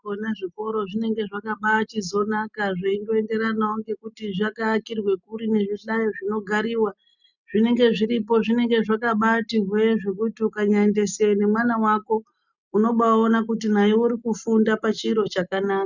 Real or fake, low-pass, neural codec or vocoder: real; 7.2 kHz; none